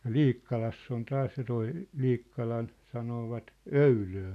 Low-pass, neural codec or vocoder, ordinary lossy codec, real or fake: 14.4 kHz; none; none; real